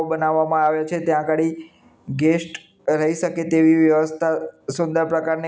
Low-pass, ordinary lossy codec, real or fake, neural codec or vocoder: none; none; real; none